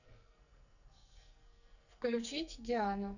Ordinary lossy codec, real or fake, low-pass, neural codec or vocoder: none; fake; 7.2 kHz; codec, 44.1 kHz, 2.6 kbps, SNAC